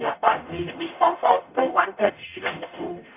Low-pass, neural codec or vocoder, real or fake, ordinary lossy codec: 3.6 kHz; codec, 44.1 kHz, 0.9 kbps, DAC; fake; none